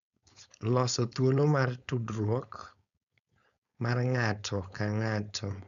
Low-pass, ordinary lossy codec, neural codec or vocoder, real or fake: 7.2 kHz; none; codec, 16 kHz, 4.8 kbps, FACodec; fake